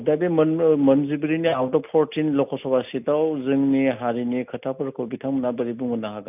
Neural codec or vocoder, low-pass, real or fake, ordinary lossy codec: none; 3.6 kHz; real; none